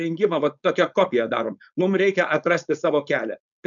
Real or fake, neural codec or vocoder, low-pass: fake; codec, 16 kHz, 4.8 kbps, FACodec; 7.2 kHz